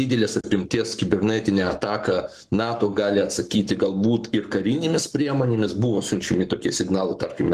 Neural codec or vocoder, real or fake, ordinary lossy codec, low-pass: codec, 44.1 kHz, 7.8 kbps, Pupu-Codec; fake; Opus, 24 kbps; 14.4 kHz